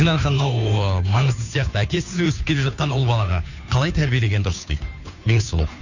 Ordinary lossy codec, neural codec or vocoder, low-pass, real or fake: none; codec, 16 kHz, 2 kbps, FunCodec, trained on Chinese and English, 25 frames a second; 7.2 kHz; fake